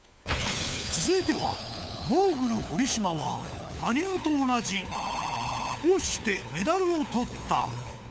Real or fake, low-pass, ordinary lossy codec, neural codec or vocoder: fake; none; none; codec, 16 kHz, 4 kbps, FunCodec, trained on LibriTTS, 50 frames a second